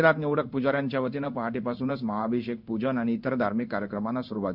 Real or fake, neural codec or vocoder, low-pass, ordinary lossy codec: fake; codec, 16 kHz in and 24 kHz out, 1 kbps, XY-Tokenizer; 5.4 kHz; none